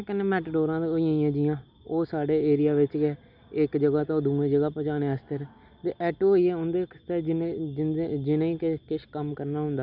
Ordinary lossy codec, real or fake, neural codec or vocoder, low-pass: none; real; none; 5.4 kHz